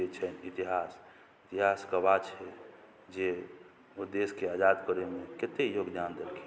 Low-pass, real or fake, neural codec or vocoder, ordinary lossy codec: none; real; none; none